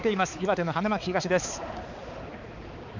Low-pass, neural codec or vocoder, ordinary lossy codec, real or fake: 7.2 kHz; codec, 16 kHz, 4 kbps, X-Codec, HuBERT features, trained on balanced general audio; none; fake